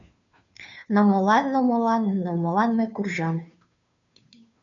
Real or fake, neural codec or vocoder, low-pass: fake; codec, 16 kHz, 2 kbps, FunCodec, trained on Chinese and English, 25 frames a second; 7.2 kHz